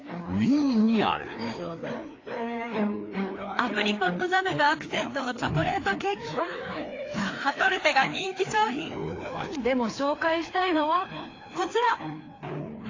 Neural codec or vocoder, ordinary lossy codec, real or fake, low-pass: codec, 16 kHz, 2 kbps, FreqCodec, larger model; AAC, 32 kbps; fake; 7.2 kHz